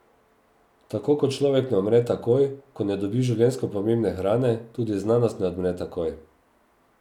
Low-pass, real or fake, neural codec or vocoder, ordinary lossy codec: 19.8 kHz; real; none; none